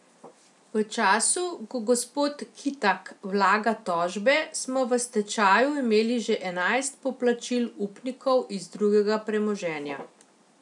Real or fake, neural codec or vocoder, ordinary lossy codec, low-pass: real; none; none; 10.8 kHz